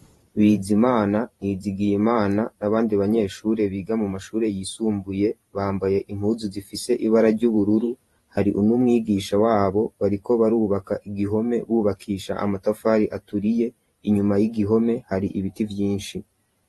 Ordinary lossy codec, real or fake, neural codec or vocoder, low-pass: AAC, 32 kbps; fake; vocoder, 44.1 kHz, 128 mel bands every 512 samples, BigVGAN v2; 19.8 kHz